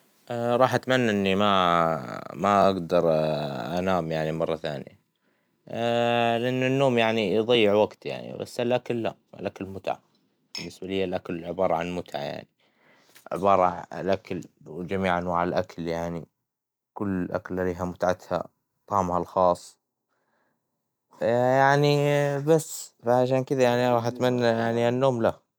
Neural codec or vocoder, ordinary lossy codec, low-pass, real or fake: none; none; none; real